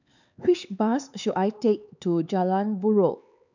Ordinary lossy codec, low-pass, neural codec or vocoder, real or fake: none; 7.2 kHz; codec, 16 kHz, 4 kbps, X-Codec, HuBERT features, trained on LibriSpeech; fake